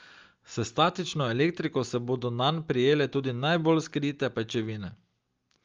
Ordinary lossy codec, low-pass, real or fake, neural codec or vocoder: Opus, 32 kbps; 7.2 kHz; real; none